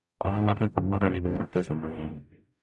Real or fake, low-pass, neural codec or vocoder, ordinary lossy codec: fake; 10.8 kHz; codec, 44.1 kHz, 0.9 kbps, DAC; none